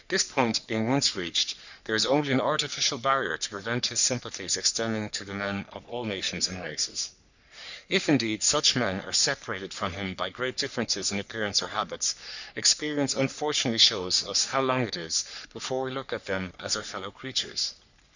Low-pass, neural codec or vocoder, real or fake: 7.2 kHz; codec, 44.1 kHz, 3.4 kbps, Pupu-Codec; fake